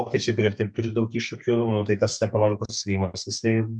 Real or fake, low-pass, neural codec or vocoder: fake; 9.9 kHz; codec, 32 kHz, 1.9 kbps, SNAC